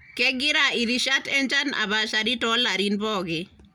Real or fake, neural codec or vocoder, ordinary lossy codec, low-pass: real; none; none; 19.8 kHz